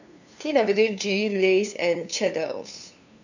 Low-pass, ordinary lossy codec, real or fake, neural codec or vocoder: 7.2 kHz; none; fake; codec, 16 kHz, 2 kbps, FunCodec, trained on LibriTTS, 25 frames a second